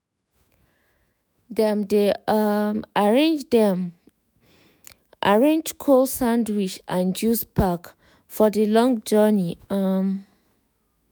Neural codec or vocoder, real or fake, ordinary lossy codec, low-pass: autoencoder, 48 kHz, 128 numbers a frame, DAC-VAE, trained on Japanese speech; fake; none; none